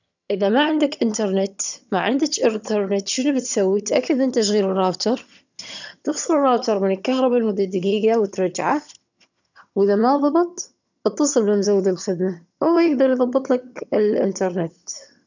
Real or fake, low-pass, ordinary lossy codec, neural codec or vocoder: fake; 7.2 kHz; none; vocoder, 22.05 kHz, 80 mel bands, HiFi-GAN